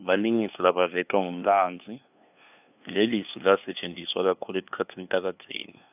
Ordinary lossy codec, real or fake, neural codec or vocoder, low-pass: none; fake; codec, 16 kHz, 2 kbps, FunCodec, trained on LibriTTS, 25 frames a second; 3.6 kHz